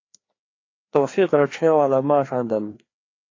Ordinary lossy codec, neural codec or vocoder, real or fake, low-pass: AAC, 32 kbps; codec, 16 kHz, 2 kbps, FreqCodec, larger model; fake; 7.2 kHz